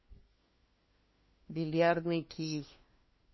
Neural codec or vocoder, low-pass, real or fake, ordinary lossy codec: codec, 16 kHz, 1 kbps, FunCodec, trained on LibriTTS, 50 frames a second; 7.2 kHz; fake; MP3, 24 kbps